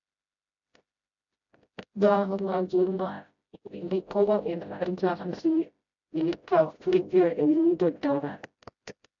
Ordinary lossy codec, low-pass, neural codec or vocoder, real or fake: AAC, 64 kbps; 7.2 kHz; codec, 16 kHz, 0.5 kbps, FreqCodec, smaller model; fake